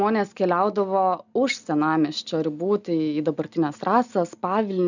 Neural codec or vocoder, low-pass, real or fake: none; 7.2 kHz; real